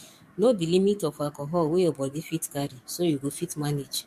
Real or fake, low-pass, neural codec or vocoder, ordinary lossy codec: fake; 14.4 kHz; codec, 44.1 kHz, 7.8 kbps, DAC; MP3, 64 kbps